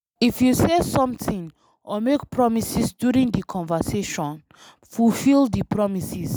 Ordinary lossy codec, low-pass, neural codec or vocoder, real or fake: none; none; none; real